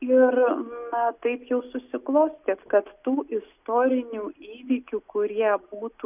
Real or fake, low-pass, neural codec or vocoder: real; 3.6 kHz; none